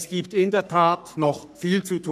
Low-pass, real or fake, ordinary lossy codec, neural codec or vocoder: 14.4 kHz; fake; none; codec, 44.1 kHz, 3.4 kbps, Pupu-Codec